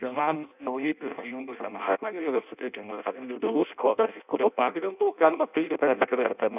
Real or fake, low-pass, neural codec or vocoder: fake; 3.6 kHz; codec, 16 kHz in and 24 kHz out, 0.6 kbps, FireRedTTS-2 codec